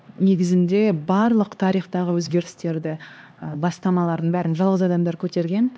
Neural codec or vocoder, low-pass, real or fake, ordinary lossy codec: codec, 16 kHz, 2 kbps, X-Codec, HuBERT features, trained on LibriSpeech; none; fake; none